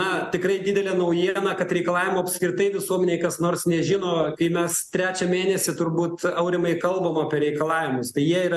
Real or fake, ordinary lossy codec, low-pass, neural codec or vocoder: real; MP3, 96 kbps; 14.4 kHz; none